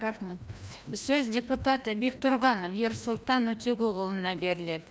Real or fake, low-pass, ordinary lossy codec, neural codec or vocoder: fake; none; none; codec, 16 kHz, 1 kbps, FreqCodec, larger model